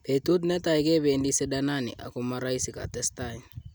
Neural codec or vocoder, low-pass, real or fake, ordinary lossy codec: none; none; real; none